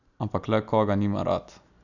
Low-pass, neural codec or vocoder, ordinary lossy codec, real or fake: 7.2 kHz; none; none; real